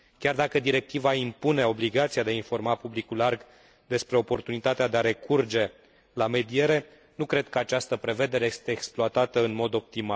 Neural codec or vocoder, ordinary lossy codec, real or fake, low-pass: none; none; real; none